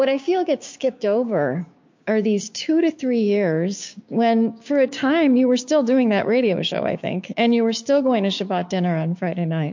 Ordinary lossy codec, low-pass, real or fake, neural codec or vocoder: MP3, 48 kbps; 7.2 kHz; fake; codec, 16 kHz, 6 kbps, DAC